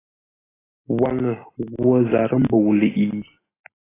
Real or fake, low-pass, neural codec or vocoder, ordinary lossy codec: real; 3.6 kHz; none; AAC, 16 kbps